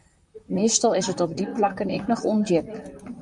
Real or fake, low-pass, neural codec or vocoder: fake; 10.8 kHz; vocoder, 44.1 kHz, 128 mel bands, Pupu-Vocoder